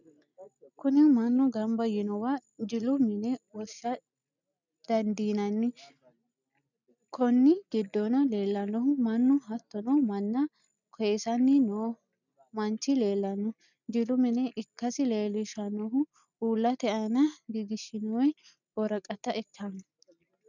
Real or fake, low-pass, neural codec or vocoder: real; 7.2 kHz; none